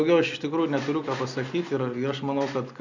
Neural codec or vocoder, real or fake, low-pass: none; real; 7.2 kHz